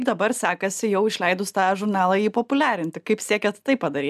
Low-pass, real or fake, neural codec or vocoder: 14.4 kHz; real; none